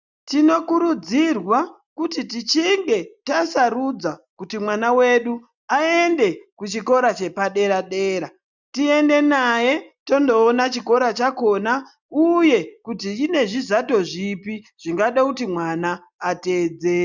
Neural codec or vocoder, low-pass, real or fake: none; 7.2 kHz; real